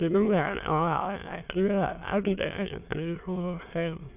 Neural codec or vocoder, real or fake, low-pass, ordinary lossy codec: autoencoder, 22.05 kHz, a latent of 192 numbers a frame, VITS, trained on many speakers; fake; 3.6 kHz; none